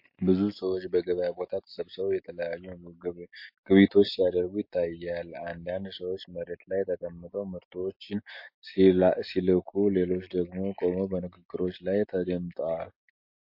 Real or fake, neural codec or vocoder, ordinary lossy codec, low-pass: real; none; MP3, 32 kbps; 5.4 kHz